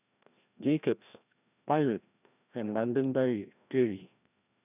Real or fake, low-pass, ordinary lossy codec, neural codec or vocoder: fake; 3.6 kHz; none; codec, 16 kHz, 1 kbps, FreqCodec, larger model